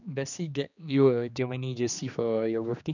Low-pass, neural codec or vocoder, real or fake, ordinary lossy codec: 7.2 kHz; codec, 16 kHz, 2 kbps, X-Codec, HuBERT features, trained on general audio; fake; none